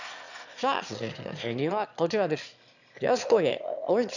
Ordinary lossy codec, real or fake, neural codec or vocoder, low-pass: none; fake; autoencoder, 22.05 kHz, a latent of 192 numbers a frame, VITS, trained on one speaker; 7.2 kHz